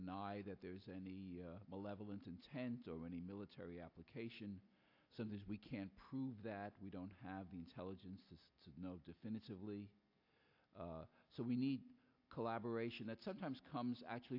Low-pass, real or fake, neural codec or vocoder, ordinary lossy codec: 5.4 kHz; real; none; AAC, 48 kbps